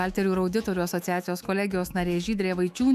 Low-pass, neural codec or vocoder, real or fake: 14.4 kHz; autoencoder, 48 kHz, 128 numbers a frame, DAC-VAE, trained on Japanese speech; fake